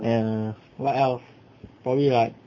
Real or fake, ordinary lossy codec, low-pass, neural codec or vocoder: real; MP3, 32 kbps; 7.2 kHz; none